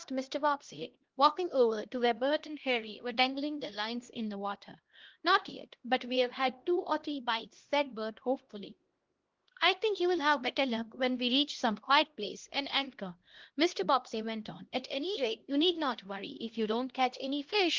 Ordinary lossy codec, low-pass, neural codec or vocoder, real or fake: Opus, 16 kbps; 7.2 kHz; codec, 16 kHz, 1 kbps, X-Codec, HuBERT features, trained on LibriSpeech; fake